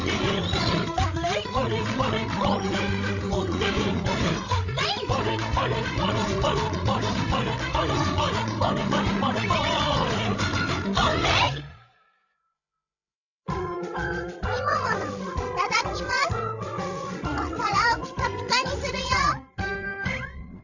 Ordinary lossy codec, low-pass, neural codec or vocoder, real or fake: none; 7.2 kHz; codec, 16 kHz, 8 kbps, FreqCodec, larger model; fake